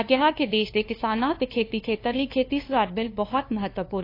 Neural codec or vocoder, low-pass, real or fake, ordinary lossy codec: codec, 16 kHz, 2 kbps, FunCodec, trained on LibriTTS, 25 frames a second; 5.4 kHz; fake; AAC, 32 kbps